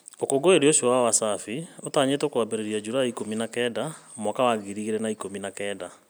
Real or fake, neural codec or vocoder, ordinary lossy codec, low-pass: real; none; none; none